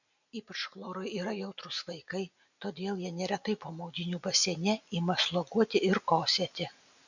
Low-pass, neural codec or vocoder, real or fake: 7.2 kHz; none; real